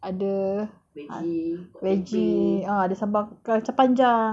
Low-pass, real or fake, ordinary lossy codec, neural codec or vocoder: none; real; none; none